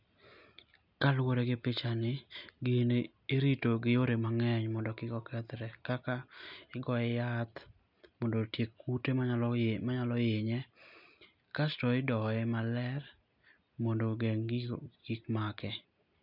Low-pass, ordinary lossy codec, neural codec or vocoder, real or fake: 5.4 kHz; none; none; real